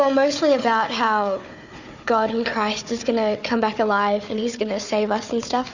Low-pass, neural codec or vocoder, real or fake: 7.2 kHz; codec, 16 kHz, 4 kbps, FunCodec, trained on Chinese and English, 50 frames a second; fake